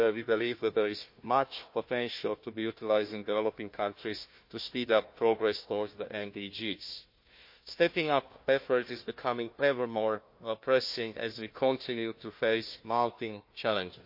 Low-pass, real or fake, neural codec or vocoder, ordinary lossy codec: 5.4 kHz; fake; codec, 16 kHz, 1 kbps, FunCodec, trained on Chinese and English, 50 frames a second; MP3, 32 kbps